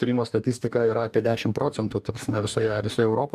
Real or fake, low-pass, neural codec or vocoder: fake; 14.4 kHz; codec, 44.1 kHz, 2.6 kbps, DAC